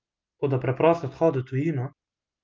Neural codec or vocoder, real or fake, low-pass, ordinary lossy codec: none; real; 7.2 kHz; Opus, 24 kbps